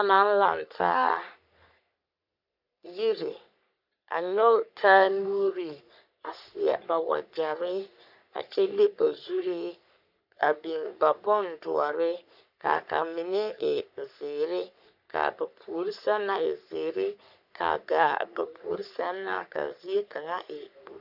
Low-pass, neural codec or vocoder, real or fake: 5.4 kHz; codec, 44.1 kHz, 3.4 kbps, Pupu-Codec; fake